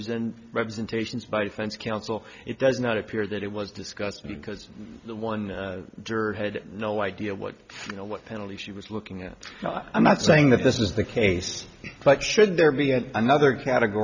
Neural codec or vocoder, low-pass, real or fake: none; 7.2 kHz; real